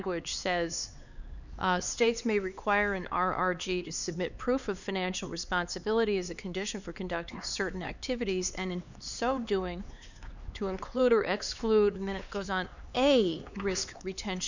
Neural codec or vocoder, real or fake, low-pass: codec, 16 kHz, 4 kbps, X-Codec, HuBERT features, trained on LibriSpeech; fake; 7.2 kHz